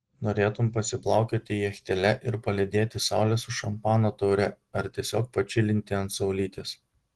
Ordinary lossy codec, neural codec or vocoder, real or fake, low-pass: Opus, 16 kbps; none; real; 10.8 kHz